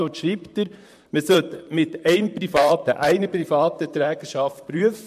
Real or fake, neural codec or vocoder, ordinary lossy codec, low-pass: fake; vocoder, 44.1 kHz, 128 mel bands, Pupu-Vocoder; MP3, 64 kbps; 14.4 kHz